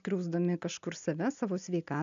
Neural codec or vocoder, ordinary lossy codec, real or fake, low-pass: none; MP3, 96 kbps; real; 7.2 kHz